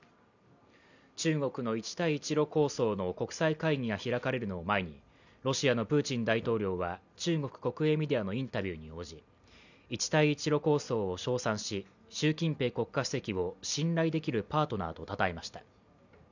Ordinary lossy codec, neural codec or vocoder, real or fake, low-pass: none; none; real; 7.2 kHz